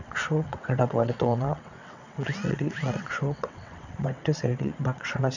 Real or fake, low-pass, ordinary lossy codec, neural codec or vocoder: fake; 7.2 kHz; none; vocoder, 22.05 kHz, 80 mel bands, WaveNeXt